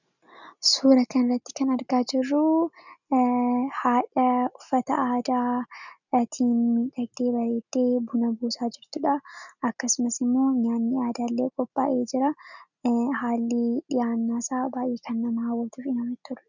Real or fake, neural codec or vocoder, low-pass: real; none; 7.2 kHz